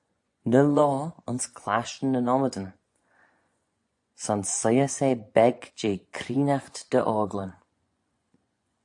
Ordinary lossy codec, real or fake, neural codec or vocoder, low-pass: AAC, 64 kbps; fake; vocoder, 24 kHz, 100 mel bands, Vocos; 10.8 kHz